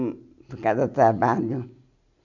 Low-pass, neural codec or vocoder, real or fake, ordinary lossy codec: 7.2 kHz; none; real; none